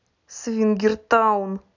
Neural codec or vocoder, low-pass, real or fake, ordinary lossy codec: none; 7.2 kHz; real; none